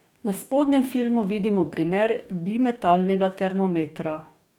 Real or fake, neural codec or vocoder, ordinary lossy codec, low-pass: fake; codec, 44.1 kHz, 2.6 kbps, DAC; none; 19.8 kHz